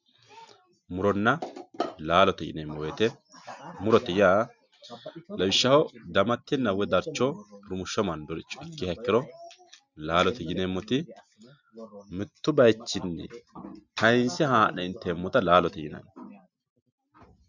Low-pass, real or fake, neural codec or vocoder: 7.2 kHz; real; none